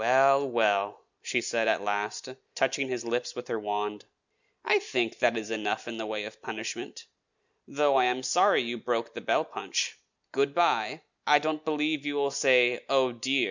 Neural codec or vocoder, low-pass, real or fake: none; 7.2 kHz; real